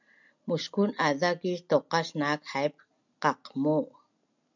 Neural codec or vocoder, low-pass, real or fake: none; 7.2 kHz; real